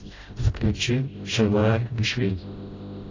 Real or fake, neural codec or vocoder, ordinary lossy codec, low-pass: fake; codec, 16 kHz, 0.5 kbps, FreqCodec, smaller model; AAC, 32 kbps; 7.2 kHz